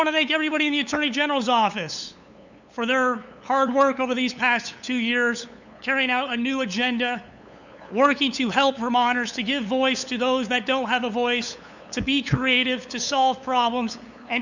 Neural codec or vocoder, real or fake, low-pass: codec, 16 kHz, 8 kbps, FunCodec, trained on LibriTTS, 25 frames a second; fake; 7.2 kHz